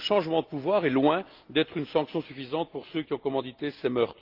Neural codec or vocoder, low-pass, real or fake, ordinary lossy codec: none; 5.4 kHz; real; Opus, 24 kbps